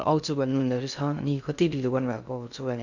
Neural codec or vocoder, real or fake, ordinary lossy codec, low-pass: codec, 16 kHz in and 24 kHz out, 0.6 kbps, FocalCodec, streaming, 2048 codes; fake; none; 7.2 kHz